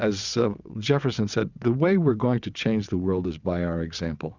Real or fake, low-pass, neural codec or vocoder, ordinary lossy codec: fake; 7.2 kHz; vocoder, 22.05 kHz, 80 mel bands, WaveNeXt; Opus, 64 kbps